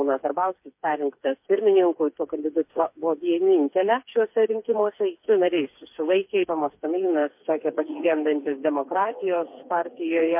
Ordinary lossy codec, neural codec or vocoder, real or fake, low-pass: MP3, 32 kbps; codec, 16 kHz, 4 kbps, FreqCodec, smaller model; fake; 3.6 kHz